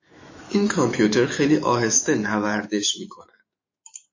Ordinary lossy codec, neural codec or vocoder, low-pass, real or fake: MP3, 32 kbps; autoencoder, 48 kHz, 128 numbers a frame, DAC-VAE, trained on Japanese speech; 7.2 kHz; fake